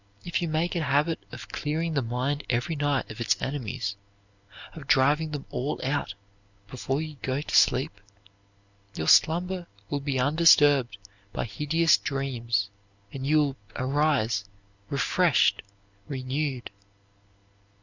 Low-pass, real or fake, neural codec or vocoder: 7.2 kHz; real; none